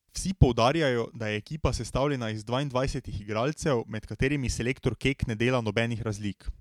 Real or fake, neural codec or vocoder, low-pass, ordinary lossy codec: real; none; 19.8 kHz; MP3, 96 kbps